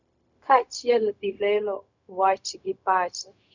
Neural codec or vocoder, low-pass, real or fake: codec, 16 kHz, 0.4 kbps, LongCat-Audio-Codec; 7.2 kHz; fake